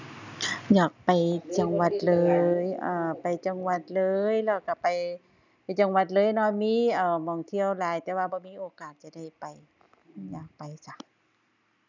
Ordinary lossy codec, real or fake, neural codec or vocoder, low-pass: none; real; none; 7.2 kHz